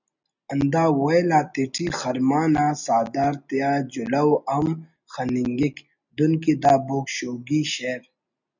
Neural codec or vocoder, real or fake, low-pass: none; real; 7.2 kHz